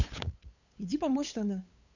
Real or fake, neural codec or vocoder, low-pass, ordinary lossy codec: fake; codec, 16 kHz, 8 kbps, FunCodec, trained on LibriTTS, 25 frames a second; 7.2 kHz; none